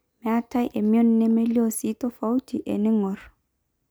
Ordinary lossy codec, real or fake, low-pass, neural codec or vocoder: none; real; none; none